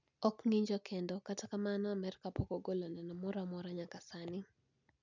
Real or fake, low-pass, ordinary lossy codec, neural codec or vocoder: real; 7.2 kHz; none; none